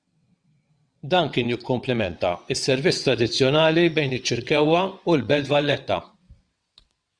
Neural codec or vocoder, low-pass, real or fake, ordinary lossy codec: vocoder, 22.05 kHz, 80 mel bands, WaveNeXt; 9.9 kHz; fake; Opus, 64 kbps